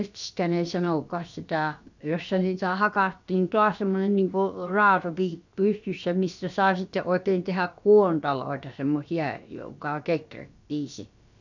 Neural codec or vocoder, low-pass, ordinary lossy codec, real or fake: codec, 16 kHz, about 1 kbps, DyCAST, with the encoder's durations; 7.2 kHz; none; fake